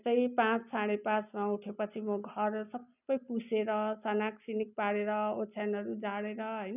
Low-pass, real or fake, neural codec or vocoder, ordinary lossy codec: 3.6 kHz; real; none; none